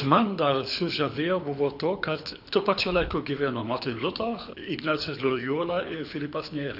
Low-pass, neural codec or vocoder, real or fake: 5.4 kHz; codec, 24 kHz, 6 kbps, HILCodec; fake